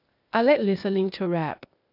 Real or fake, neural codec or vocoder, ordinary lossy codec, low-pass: fake; codec, 16 kHz, 0.8 kbps, ZipCodec; none; 5.4 kHz